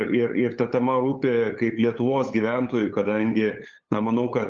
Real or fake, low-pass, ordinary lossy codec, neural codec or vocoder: fake; 7.2 kHz; Opus, 32 kbps; codec, 16 kHz, 8 kbps, FunCodec, trained on LibriTTS, 25 frames a second